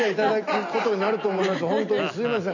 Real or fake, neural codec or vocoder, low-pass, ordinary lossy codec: real; none; 7.2 kHz; none